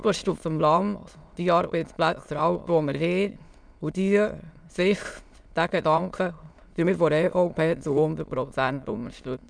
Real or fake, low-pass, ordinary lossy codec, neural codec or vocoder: fake; 9.9 kHz; none; autoencoder, 22.05 kHz, a latent of 192 numbers a frame, VITS, trained on many speakers